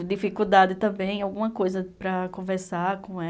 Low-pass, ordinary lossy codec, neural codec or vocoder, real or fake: none; none; none; real